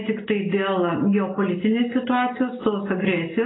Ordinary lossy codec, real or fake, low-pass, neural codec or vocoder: AAC, 16 kbps; real; 7.2 kHz; none